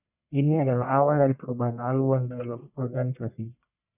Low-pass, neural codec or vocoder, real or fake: 3.6 kHz; codec, 44.1 kHz, 1.7 kbps, Pupu-Codec; fake